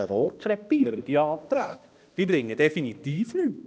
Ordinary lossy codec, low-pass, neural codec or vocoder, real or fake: none; none; codec, 16 kHz, 1 kbps, X-Codec, HuBERT features, trained on balanced general audio; fake